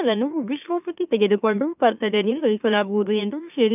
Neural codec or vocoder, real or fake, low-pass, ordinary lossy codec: autoencoder, 44.1 kHz, a latent of 192 numbers a frame, MeloTTS; fake; 3.6 kHz; none